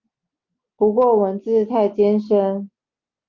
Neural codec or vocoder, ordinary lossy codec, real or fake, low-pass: none; Opus, 24 kbps; real; 7.2 kHz